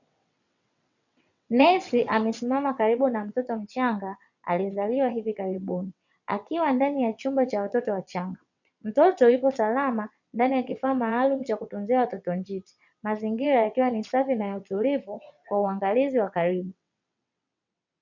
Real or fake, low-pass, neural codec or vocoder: fake; 7.2 kHz; vocoder, 22.05 kHz, 80 mel bands, WaveNeXt